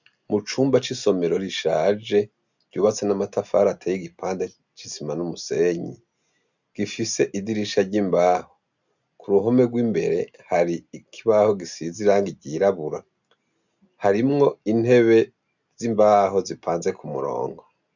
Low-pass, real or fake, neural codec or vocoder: 7.2 kHz; real; none